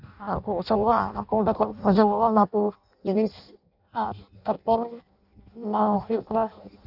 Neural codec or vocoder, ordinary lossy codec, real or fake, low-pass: codec, 16 kHz in and 24 kHz out, 0.6 kbps, FireRedTTS-2 codec; none; fake; 5.4 kHz